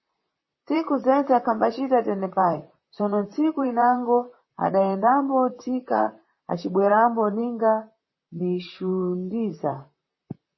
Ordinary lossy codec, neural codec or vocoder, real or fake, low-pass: MP3, 24 kbps; vocoder, 44.1 kHz, 128 mel bands, Pupu-Vocoder; fake; 7.2 kHz